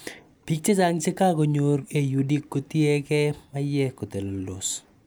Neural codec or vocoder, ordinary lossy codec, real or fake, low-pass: none; none; real; none